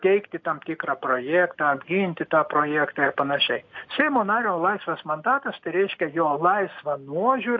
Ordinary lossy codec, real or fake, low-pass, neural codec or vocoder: AAC, 48 kbps; real; 7.2 kHz; none